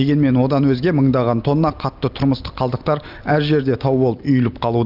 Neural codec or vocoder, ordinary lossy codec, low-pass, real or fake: none; Opus, 24 kbps; 5.4 kHz; real